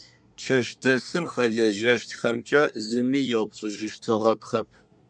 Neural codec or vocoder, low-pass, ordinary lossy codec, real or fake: codec, 24 kHz, 1 kbps, SNAC; 9.9 kHz; MP3, 96 kbps; fake